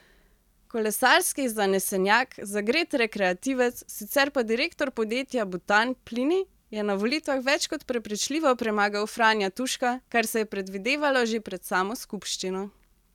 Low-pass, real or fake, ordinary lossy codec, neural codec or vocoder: 19.8 kHz; real; none; none